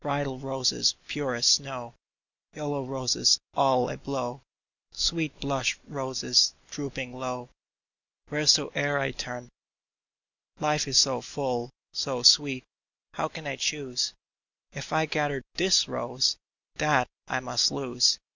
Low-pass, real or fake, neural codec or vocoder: 7.2 kHz; real; none